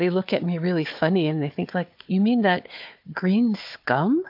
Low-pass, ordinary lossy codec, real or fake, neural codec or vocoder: 5.4 kHz; MP3, 48 kbps; fake; codec, 16 kHz, 4 kbps, FunCodec, trained on Chinese and English, 50 frames a second